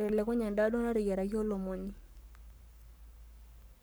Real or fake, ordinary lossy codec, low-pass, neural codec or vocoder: fake; none; none; codec, 44.1 kHz, 7.8 kbps, Pupu-Codec